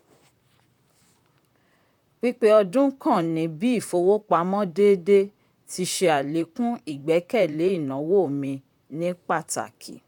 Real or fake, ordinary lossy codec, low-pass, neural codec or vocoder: fake; none; 19.8 kHz; vocoder, 44.1 kHz, 128 mel bands, Pupu-Vocoder